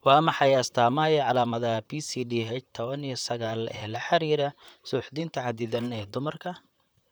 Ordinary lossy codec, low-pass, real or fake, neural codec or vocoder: none; none; fake; vocoder, 44.1 kHz, 128 mel bands, Pupu-Vocoder